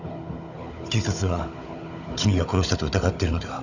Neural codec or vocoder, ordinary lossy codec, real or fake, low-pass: codec, 16 kHz, 16 kbps, FunCodec, trained on Chinese and English, 50 frames a second; none; fake; 7.2 kHz